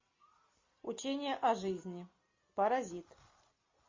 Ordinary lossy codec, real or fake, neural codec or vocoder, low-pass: MP3, 32 kbps; real; none; 7.2 kHz